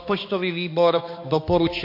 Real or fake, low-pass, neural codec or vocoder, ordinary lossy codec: fake; 5.4 kHz; codec, 16 kHz, 2 kbps, X-Codec, HuBERT features, trained on balanced general audio; MP3, 32 kbps